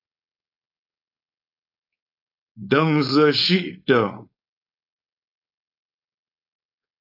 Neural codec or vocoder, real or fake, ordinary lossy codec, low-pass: codec, 16 kHz, 4.8 kbps, FACodec; fake; AAC, 32 kbps; 5.4 kHz